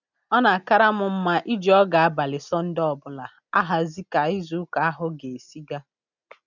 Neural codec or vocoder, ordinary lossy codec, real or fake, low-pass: none; none; real; 7.2 kHz